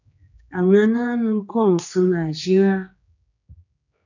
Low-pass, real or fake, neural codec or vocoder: 7.2 kHz; fake; codec, 16 kHz, 2 kbps, X-Codec, HuBERT features, trained on general audio